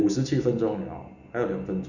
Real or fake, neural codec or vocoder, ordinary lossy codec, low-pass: real; none; none; 7.2 kHz